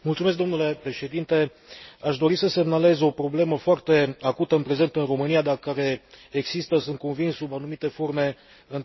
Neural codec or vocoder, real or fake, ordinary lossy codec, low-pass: none; real; MP3, 24 kbps; 7.2 kHz